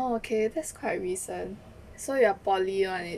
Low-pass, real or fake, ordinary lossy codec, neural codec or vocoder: 14.4 kHz; real; none; none